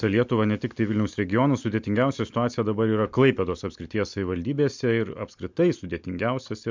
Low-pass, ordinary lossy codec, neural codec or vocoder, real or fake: 7.2 kHz; MP3, 64 kbps; none; real